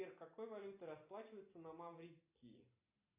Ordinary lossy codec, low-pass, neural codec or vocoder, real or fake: AAC, 32 kbps; 3.6 kHz; none; real